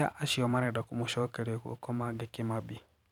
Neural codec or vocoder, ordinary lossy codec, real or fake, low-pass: vocoder, 44.1 kHz, 128 mel bands every 512 samples, BigVGAN v2; none; fake; 19.8 kHz